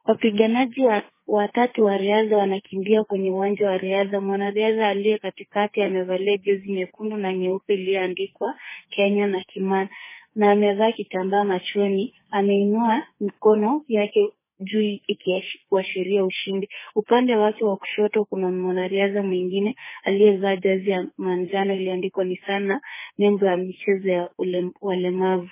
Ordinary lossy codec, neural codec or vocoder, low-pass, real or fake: MP3, 16 kbps; codec, 44.1 kHz, 2.6 kbps, SNAC; 3.6 kHz; fake